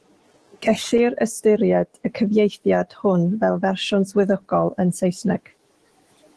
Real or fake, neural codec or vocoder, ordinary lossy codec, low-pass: fake; autoencoder, 48 kHz, 128 numbers a frame, DAC-VAE, trained on Japanese speech; Opus, 16 kbps; 10.8 kHz